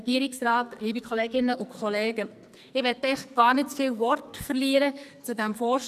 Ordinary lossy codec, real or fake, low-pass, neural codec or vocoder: none; fake; 14.4 kHz; codec, 44.1 kHz, 2.6 kbps, SNAC